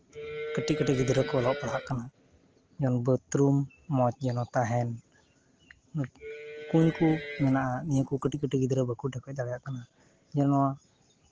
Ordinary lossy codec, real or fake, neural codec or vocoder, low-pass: Opus, 16 kbps; real; none; 7.2 kHz